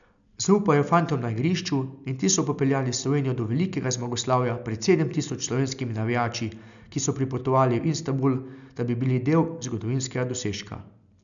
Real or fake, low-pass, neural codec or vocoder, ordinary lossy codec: real; 7.2 kHz; none; none